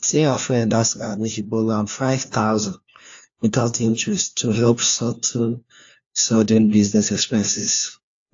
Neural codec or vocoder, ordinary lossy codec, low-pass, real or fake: codec, 16 kHz, 1 kbps, FunCodec, trained on LibriTTS, 50 frames a second; AAC, 48 kbps; 7.2 kHz; fake